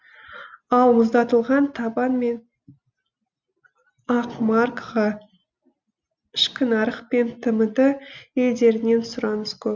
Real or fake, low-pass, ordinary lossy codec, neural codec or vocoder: real; none; none; none